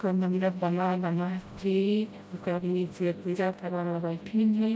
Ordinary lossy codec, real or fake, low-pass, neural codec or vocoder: none; fake; none; codec, 16 kHz, 0.5 kbps, FreqCodec, smaller model